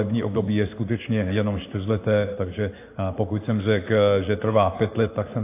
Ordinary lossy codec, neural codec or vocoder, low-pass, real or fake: AAC, 24 kbps; codec, 16 kHz in and 24 kHz out, 1 kbps, XY-Tokenizer; 3.6 kHz; fake